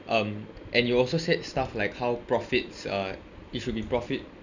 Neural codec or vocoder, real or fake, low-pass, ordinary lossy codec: none; real; 7.2 kHz; none